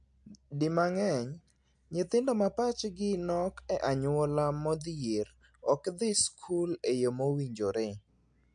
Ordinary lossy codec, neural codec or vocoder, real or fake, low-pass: MP3, 64 kbps; none; real; 9.9 kHz